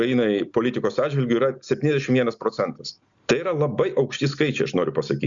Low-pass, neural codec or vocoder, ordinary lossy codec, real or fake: 7.2 kHz; none; Opus, 64 kbps; real